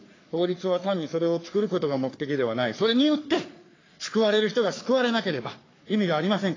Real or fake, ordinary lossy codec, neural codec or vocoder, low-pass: fake; AAC, 32 kbps; codec, 44.1 kHz, 3.4 kbps, Pupu-Codec; 7.2 kHz